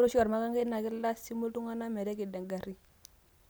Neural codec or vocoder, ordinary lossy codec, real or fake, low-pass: none; none; real; none